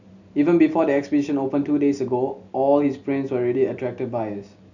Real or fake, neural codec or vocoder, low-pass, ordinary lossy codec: real; none; 7.2 kHz; none